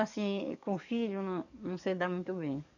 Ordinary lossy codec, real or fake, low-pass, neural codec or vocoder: none; fake; 7.2 kHz; codec, 44.1 kHz, 7.8 kbps, DAC